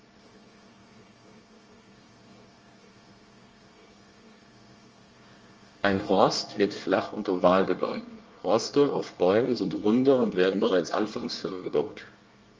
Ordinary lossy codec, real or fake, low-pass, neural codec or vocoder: Opus, 24 kbps; fake; 7.2 kHz; codec, 24 kHz, 1 kbps, SNAC